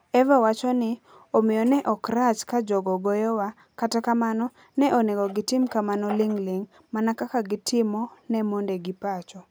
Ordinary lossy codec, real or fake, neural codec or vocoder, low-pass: none; real; none; none